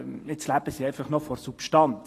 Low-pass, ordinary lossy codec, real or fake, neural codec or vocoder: 14.4 kHz; AAC, 48 kbps; real; none